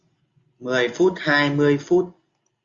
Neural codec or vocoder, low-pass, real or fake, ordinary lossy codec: none; 7.2 kHz; real; Opus, 64 kbps